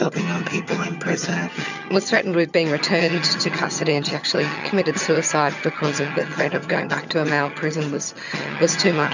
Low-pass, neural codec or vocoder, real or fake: 7.2 kHz; vocoder, 22.05 kHz, 80 mel bands, HiFi-GAN; fake